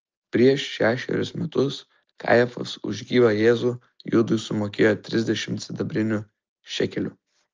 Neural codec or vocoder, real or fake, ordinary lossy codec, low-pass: none; real; Opus, 24 kbps; 7.2 kHz